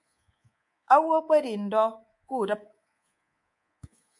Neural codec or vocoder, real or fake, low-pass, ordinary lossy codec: codec, 24 kHz, 3.1 kbps, DualCodec; fake; 10.8 kHz; MP3, 64 kbps